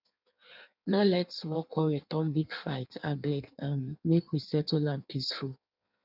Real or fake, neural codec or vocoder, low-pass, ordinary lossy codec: fake; codec, 16 kHz in and 24 kHz out, 1.1 kbps, FireRedTTS-2 codec; 5.4 kHz; none